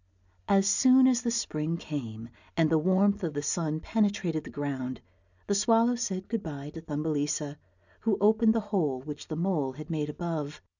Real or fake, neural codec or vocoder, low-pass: real; none; 7.2 kHz